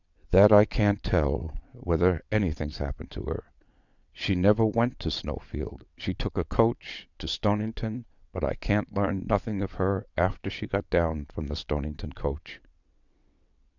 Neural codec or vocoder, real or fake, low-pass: vocoder, 22.05 kHz, 80 mel bands, WaveNeXt; fake; 7.2 kHz